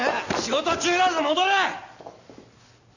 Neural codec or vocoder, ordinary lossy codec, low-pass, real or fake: none; none; 7.2 kHz; real